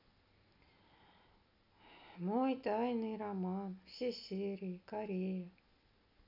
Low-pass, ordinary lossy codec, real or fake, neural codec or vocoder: 5.4 kHz; none; real; none